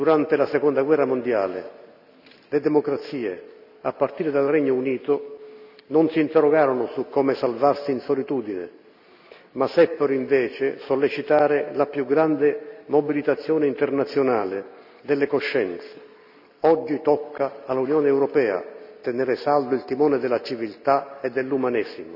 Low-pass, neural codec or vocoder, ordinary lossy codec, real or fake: 5.4 kHz; none; none; real